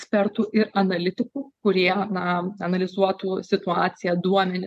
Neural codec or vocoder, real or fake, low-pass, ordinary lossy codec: none; real; 14.4 kHz; MP3, 64 kbps